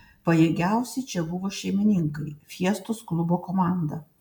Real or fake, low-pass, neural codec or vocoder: real; 19.8 kHz; none